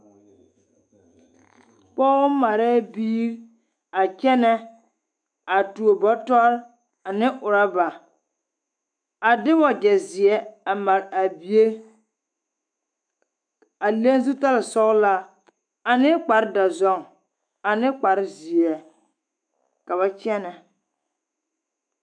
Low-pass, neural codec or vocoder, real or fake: 9.9 kHz; autoencoder, 48 kHz, 128 numbers a frame, DAC-VAE, trained on Japanese speech; fake